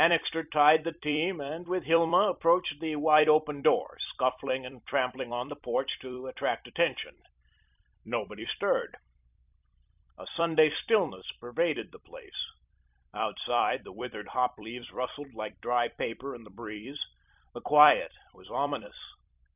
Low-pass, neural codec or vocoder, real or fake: 3.6 kHz; vocoder, 44.1 kHz, 128 mel bands every 256 samples, BigVGAN v2; fake